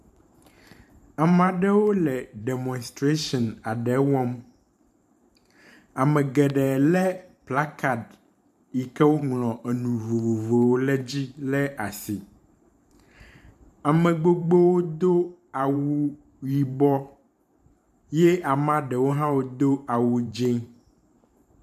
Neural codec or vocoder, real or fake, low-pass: vocoder, 44.1 kHz, 128 mel bands every 512 samples, BigVGAN v2; fake; 14.4 kHz